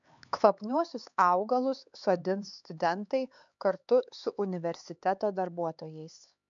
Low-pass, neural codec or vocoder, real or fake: 7.2 kHz; codec, 16 kHz, 4 kbps, X-Codec, HuBERT features, trained on LibriSpeech; fake